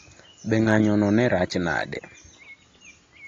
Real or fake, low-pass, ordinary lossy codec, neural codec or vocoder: real; 7.2 kHz; AAC, 32 kbps; none